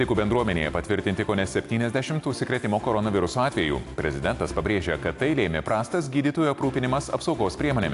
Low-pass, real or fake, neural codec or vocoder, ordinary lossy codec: 10.8 kHz; real; none; AAC, 64 kbps